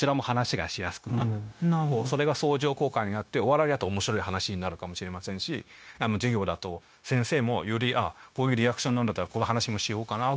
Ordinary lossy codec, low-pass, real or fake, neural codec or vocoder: none; none; fake; codec, 16 kHz, 0.9 kbps, LongCat-Audio-Codec